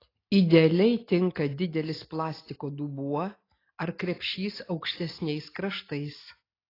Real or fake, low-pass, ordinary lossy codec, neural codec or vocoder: real; 5.4 kHz; AAC, 24 kbps; none